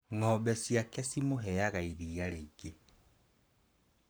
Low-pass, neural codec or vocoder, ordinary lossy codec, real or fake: none; codec, 44.1 kHz, 7.8 kbps, Pupu-Codec; none; fake